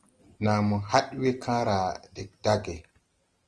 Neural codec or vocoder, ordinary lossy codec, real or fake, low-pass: none; Opus, 24 kbps; real; 9.9 kHz